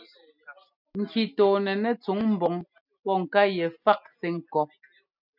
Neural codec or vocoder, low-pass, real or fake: none; 5.4 kHz; real